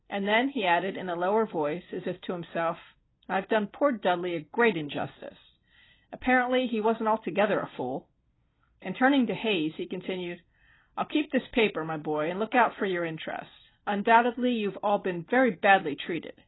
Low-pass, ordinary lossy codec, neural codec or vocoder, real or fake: 7.2 kHz; AAC, 16 kbps; none; real